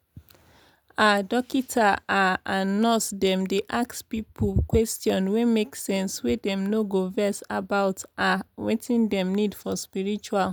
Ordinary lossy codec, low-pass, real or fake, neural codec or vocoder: none; none; real; none